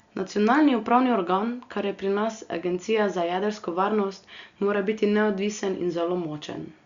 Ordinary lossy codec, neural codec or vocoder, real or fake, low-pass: Opus, 64 kbps; none; real; 7.2 kHz